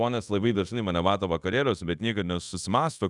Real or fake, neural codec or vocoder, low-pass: fake; codec, 24 kHz, 0.5 kbps, DualCodec; 10.8 kHz